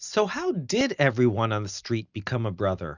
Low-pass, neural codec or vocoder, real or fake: 7.2 kHz; none; real